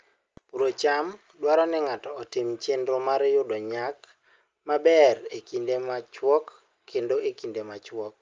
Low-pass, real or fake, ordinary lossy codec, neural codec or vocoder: 7.2 kHz; real; Opus, 24 kbps; none